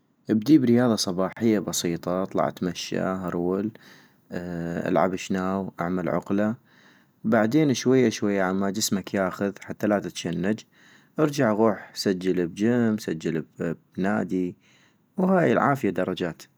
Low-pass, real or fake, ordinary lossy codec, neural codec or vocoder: none; real; none; none